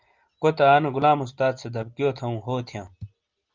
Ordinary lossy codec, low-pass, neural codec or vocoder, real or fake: Opus, 24 kbps; 7.2 kHz; none; real